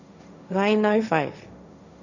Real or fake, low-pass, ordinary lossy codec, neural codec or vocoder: fake; 7.2 kHz; none; codec, 16 kHz, 1.1 kbps, Voila-Tokenizer